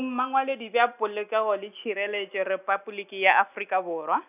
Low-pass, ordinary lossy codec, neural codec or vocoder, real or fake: 3.6 kHz; none; none; real